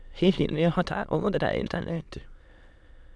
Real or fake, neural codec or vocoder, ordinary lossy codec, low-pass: fake; autoencoder, 22.05 kHz, a latent of 192 numbers a frame, VITS, trained on many speakers; none; none